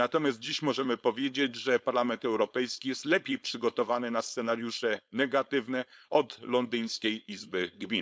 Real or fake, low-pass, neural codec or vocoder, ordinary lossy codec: fake; none; codec, 16 kHz, 4.8 kbps, FACodec; none